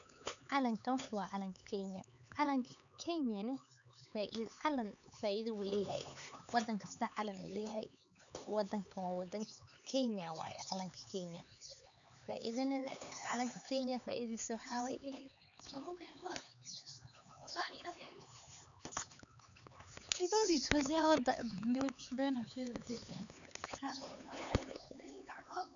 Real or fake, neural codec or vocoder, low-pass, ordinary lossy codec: fake; codec, 16 kHz, 4 kbps, X-Codec, HuBERT features, trained on LibriSpeech; 7.2 kHz; none